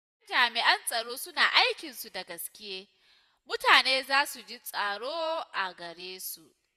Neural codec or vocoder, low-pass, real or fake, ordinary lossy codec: vocoder, 48 kHz, 128 mel bands, Vocos; 14.4 kHz; fake; none